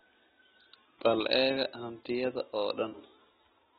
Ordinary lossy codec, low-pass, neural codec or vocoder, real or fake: AAC, 16 kbps; 9.9 kHz; none; real